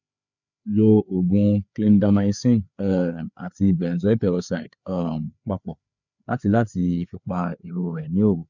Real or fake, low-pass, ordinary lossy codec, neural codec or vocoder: fake; 7.2 kHz; none; codec, 16 kHz, 4 kbps, FreqCodec, larger model